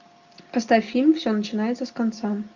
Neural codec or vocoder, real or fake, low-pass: none; real; 7.2 kHz